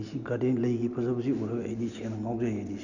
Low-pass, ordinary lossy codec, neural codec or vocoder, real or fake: 7.2 kHz; none; none; real